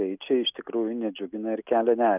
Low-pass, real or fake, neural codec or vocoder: 3.6 kHz; real; none